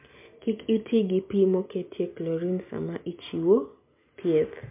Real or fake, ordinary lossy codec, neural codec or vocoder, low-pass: real; MP3, 32 kbps; none; 3.6 kHz